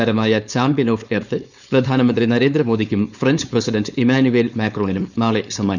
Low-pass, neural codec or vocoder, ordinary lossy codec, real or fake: 7.2 kHz; codec, 16 kHz, 4.8 kbps, FACodec; none; fake